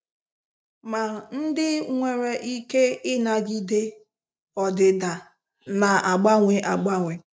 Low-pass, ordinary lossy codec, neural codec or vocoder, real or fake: none; none; none; real